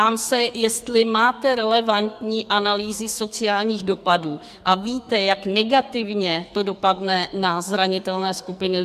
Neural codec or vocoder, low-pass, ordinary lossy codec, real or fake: codec, 44.1 kHz, 2.6 kbps, SNAC; 14.4 kHz; MP3, 96 kbps; fake